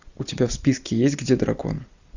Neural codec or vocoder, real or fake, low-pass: none; real; 7.2 kHz